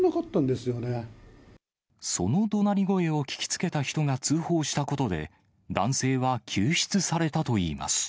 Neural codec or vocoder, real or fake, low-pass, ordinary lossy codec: none; real; none; none